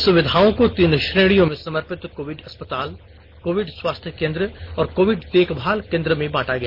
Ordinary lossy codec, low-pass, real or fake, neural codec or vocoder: MP3, 48 kbps; 5.4 kHz; real; none